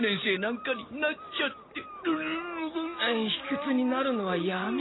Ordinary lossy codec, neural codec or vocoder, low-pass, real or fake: AAC, 16 kbps; none; 7.2 kHz; real